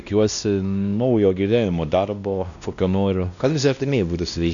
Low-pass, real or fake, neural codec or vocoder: 7.2 kHz; fake; codec, 16 kHz, 1 kbps, X-Codec, WavLM features, trained on Multilingual LibriSpeech